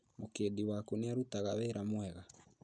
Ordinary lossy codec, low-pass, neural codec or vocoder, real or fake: none; 9.9 kHz; none; real